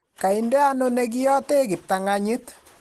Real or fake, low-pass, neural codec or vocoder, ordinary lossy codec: real; 14.4 kHz; none; Opus, 16 kbps